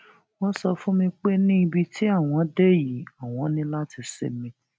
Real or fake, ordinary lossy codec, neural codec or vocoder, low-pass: real; none; none; none